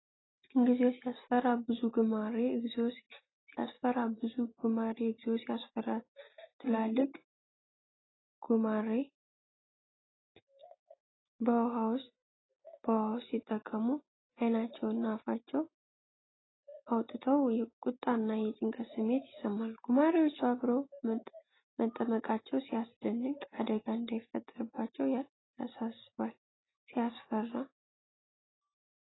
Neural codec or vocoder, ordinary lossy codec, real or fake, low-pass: none; AAC, 16 kbps; real; 7.2 kHz